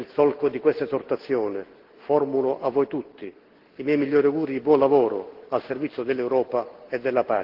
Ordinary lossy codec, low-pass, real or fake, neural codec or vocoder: Opus, 16 kbps; 5.4 kHz; real; none